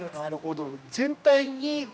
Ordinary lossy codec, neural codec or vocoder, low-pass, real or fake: none; codec, 16 kHz, 1 kbps, X-Codec, HuBERT features, trained on general audio; none; fake